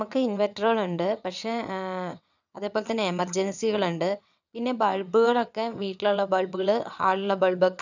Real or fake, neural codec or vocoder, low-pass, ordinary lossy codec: fake; vocoder, 22.05 kHz, 80 mel bands, WaveNeXt; 7.2 kHz; none